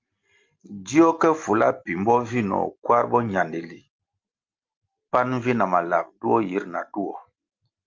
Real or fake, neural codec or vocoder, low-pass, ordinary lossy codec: real; none; 7.2 kHz; Opus, 32 kbps